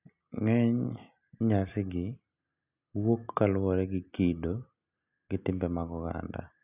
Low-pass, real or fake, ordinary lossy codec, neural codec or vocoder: 3.6 kHz; real; none; none